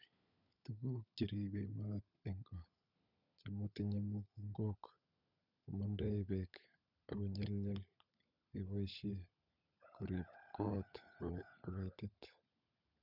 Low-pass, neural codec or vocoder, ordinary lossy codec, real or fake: 5.4 kHz; codec, 16 kHz, 16 kbps, FunCodec, trained on LibriTTS, 50 frames a second; none; fake